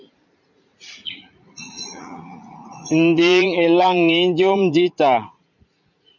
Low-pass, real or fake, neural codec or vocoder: 7.2 kHz; fake; vocoder, 44.1 kHz, 80 mel bands, Vocos